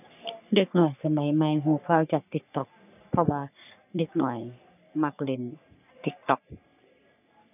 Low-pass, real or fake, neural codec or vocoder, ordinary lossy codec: 3.6 kHz; fake; codec, 44.1 kHz, 3.4 kbps, Pupu-Codec; none